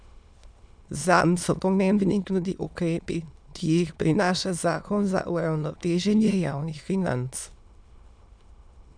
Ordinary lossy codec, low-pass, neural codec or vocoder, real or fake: none; 9.9 kHz; autoencoder, 22.05 kHz, a latent of 192 numbers a frame, VITS, trained on many speakers; fake